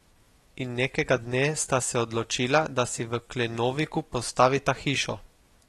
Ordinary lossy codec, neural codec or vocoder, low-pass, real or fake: AAC, 32 kbps; autoencoder, 48 kHz, 128 numbers a frame, DAC-VAE, trained on Japanese speech; 19.8 kHz; fake